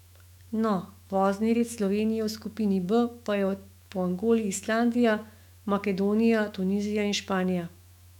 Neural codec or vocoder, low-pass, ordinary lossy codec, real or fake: autoencoder, 48 kHz, 128 numbers a frame, DAC-VAE, trained on Japanese speech; 19.8 kHz; none; fake